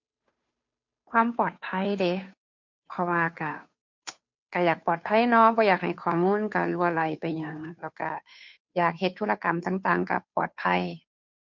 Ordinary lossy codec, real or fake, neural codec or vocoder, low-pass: MP3, 48 kbps; fake; codec, 16 kHz, 2 kbps, FunCodec, trained on Chinese and English, 25 frames a second; 7.2 kHz